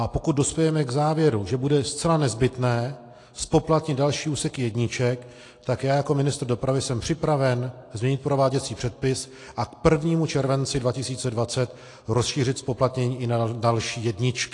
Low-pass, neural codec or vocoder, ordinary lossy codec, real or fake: 10.8 kHz; none; AAC, 48 kbps; real